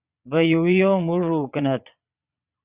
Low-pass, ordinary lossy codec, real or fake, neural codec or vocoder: 3.6 kHz; Opus, 64 kbps; fake; vocoder, 22.05 kHz, 80 mel bands, Vocos